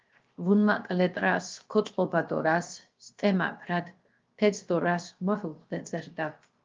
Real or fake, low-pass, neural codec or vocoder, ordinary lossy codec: fake; 7.2 kHz; codec, 16 kHz, 0.7 kbps, FocalCodec; Opus, 32 kbps